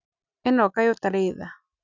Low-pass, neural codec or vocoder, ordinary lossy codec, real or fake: 7.2 kHz; none; none; real